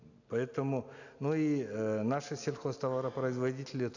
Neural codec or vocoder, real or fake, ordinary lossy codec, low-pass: none; real; none; 7.2 kHz